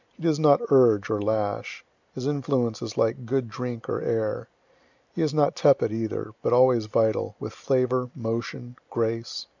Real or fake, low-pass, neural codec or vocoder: real; 7.2 kHz; none